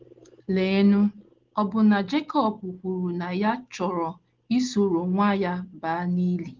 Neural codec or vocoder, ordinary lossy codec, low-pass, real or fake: none; Opus, 16 kbps; 7.2 kHz; real